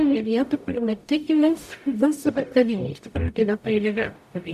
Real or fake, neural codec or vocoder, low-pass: fake; codec, 44.1 kHz, 0.9 kbps, DAC; 14.4 kHz